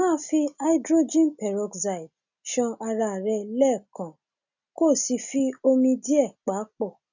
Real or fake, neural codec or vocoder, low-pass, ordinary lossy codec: real; none; 7.2 kHz; none